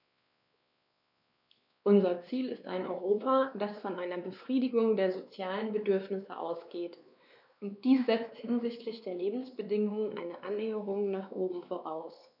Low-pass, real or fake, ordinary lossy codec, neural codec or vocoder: 5.4 kHz; fake; none; codec, 16 kHz, 2 kbps, X-Codec, WavLM features, trained on Multilingual LibriSpeech